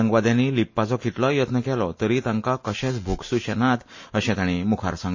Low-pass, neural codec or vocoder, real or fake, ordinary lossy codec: 7.2 kHz; none; real; MP3, 32 kbps